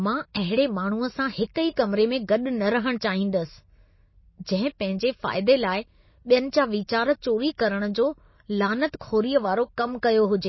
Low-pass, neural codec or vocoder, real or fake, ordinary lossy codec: 7.2 kHz; none; real; MP3, 24 kbps